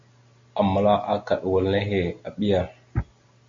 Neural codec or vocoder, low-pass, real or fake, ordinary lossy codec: none; 7.2 kHz; real; AAC, 48 kbps